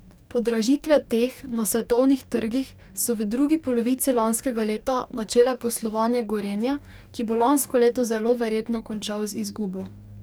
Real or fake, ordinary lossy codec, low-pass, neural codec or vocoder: fake; none; none; codec, 44.1 kHz, 2.6 kbps, DAC